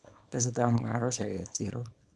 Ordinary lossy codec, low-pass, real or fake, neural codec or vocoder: none; none; fake; codec, 24 kHz, 0.9 kbps, WavTokenizer, small release